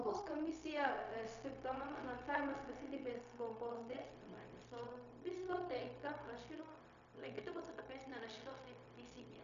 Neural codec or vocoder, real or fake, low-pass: codec, 16 kHz, 0.4 kbps, LongCat-Audio-Codec; fake; 7.2 kHz